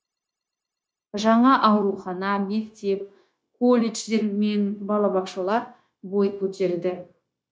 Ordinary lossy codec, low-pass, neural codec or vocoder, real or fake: none; none; codec, 16 kHz, 0.9 kbps, LongCat-Audio-Codec; fake